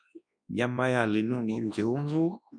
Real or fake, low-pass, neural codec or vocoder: fake; 9.9 kHz; codec, 24 kHz, 0.9 kbps, WavTokenizer, large speech release